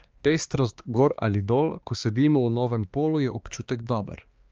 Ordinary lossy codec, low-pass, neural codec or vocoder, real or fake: Opus, 24 kbps; 7.2 kHz; codec, 16 kHz, 2 kbps, X-Codec, HuBERT features, trained on balanced general audio; fake